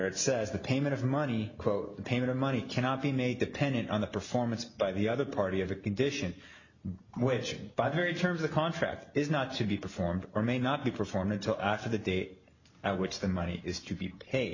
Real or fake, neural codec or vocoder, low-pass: real; none; 7.2 kHz